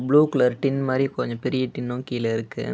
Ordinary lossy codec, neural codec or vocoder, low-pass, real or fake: none; none; none; real